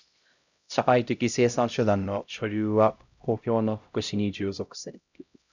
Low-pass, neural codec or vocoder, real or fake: 7.2 kHz; codec, 16 kHz, 0.5 kbps, X-Codec, HuBERT features, trained on LibriSpeech; fake